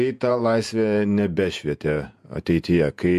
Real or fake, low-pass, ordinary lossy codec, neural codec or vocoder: fake; 14.4 kHz; MP3, 64 kbps; vocoder, 48 kHz, 128 mel bands, Vocos